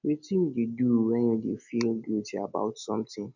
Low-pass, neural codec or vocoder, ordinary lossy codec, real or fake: 7.2 kHz; none; none; real